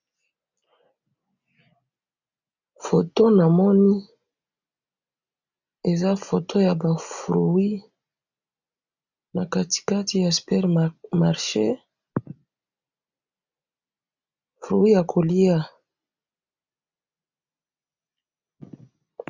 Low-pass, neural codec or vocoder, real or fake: 7.2 kHz; none; real